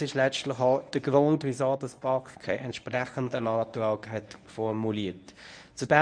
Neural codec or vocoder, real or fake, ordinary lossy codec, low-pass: codec, 24 kHz, 0.9 kbps, WavTokenizer, medium speech release version 1; fake; none; 9.9 kHz